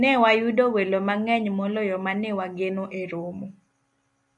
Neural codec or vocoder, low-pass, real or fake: none; 10.8 kHz; real